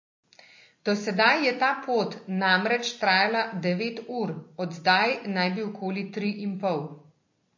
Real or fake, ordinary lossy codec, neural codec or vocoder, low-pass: real; MP3, 32 kbps; none; 7.2 kHz